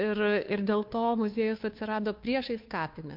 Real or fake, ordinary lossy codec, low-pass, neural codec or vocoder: fake; MP3, 48 kbps; 5.4 kHz; codec, 16 kHz, 2 kbps, FunCodec, trained on Chinese and English, 25 frames a second